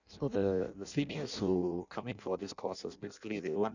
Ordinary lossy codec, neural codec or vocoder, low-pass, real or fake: none; codec, 16 kHz in and 24 kHz out, 0.6 kbps, FireRedTTS-2 codec; 7.2 kHz; fake